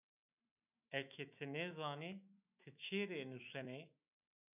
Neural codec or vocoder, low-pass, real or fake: autoencoder, 48 kHz, 128 numbers a frame, DAC-VAE, trained on Japanese speech; 3.6 kHz; fake